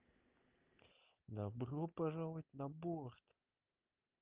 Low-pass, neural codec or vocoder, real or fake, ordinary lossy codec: 3.6 kHz; none; real; Opus, 24 kbps